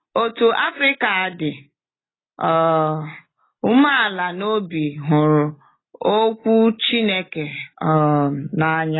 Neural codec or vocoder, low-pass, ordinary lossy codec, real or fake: none; 7.2 kHz; AAC, 16 kbps; real